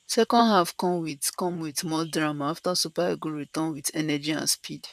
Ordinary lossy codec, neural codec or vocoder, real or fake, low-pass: none; vocoder, 44.1 kHz, 128 mel bands, Pupu-Vocoder; fake; 14.4 kHz